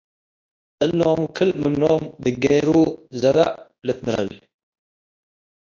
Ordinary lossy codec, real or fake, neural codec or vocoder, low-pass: AAC, 48 kbps; fake; codec, 24 kHz, 0.9 kbps, WavTokenizer, large speech release; 7.2 kHz